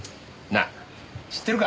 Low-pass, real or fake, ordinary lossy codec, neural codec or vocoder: none; real; none; none